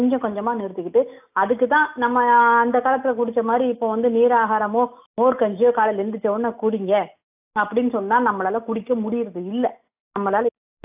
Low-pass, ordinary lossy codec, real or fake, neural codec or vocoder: 3.6 kHz; AAC, 32 kbps; real; none